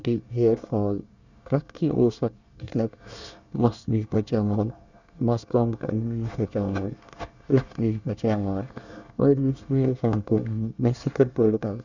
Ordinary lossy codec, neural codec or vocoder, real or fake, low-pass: none; codec, 24 kHz, 1 kbps, SNAC; fake; 7.2 kHz